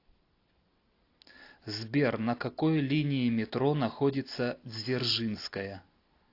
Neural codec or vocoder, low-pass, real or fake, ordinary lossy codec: none; 5.4 kHz; real; AAC, 32 kbps